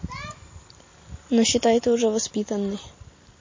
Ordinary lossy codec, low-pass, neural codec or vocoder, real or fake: MP3, 32 kbps; 7.2 kHz; none; real